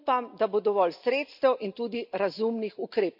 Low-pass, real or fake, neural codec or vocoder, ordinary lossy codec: 5.4 kHz; real; none; AAC, 48 kbps